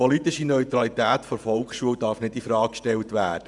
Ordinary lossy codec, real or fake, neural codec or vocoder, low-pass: none; fake; vocoder, 48 kHz, 128 mel bands, Vocos; 10.8 kHz